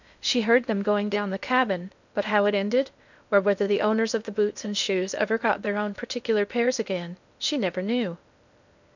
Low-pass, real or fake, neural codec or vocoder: 7.2 kHz; fake; codec, 16 kHz in and 24 kHz out, 0.6 kbps, FocalCodec, streaming, 2048 codes